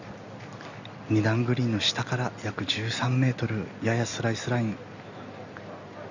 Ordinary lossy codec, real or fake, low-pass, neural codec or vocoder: none; real; 7.2 kHz; none